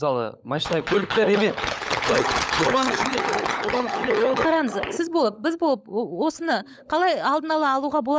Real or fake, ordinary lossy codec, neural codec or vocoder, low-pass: fake; none; codec, 16 kHz, 16 kbps, FunCodec, trained on LibriTTS, 50 frames a second; none